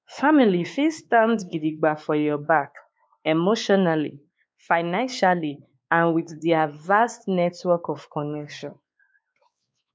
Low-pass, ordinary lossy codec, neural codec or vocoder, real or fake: none; none; codec, 16 kHz, 4 kbps, X-Codec, WavLM features, trained on Multilingual LibriSpeech; fake